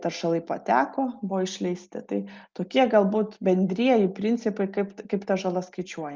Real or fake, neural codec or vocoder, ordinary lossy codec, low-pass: real; none; Opus, 24 kbps; 7.2 kHz